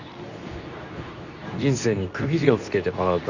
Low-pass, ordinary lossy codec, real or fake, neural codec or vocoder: 7.2 kHz; none; fake; codec, 24 kHz, 0.9 kbps, WavTokenizer, medium speech release version 2